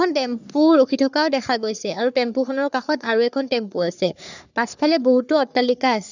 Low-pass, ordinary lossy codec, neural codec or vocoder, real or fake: 7.2 kHz; none; codec, 44.1 kHz, 3.4 kbps, Pupu-Codec; fake